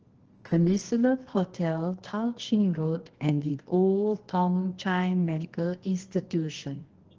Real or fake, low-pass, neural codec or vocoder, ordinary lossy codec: fake; 7.2 kHz; codec, 24 kHz, 0.9 kbps, WavTokenizer, medium music audio release; Opus, 16 kbps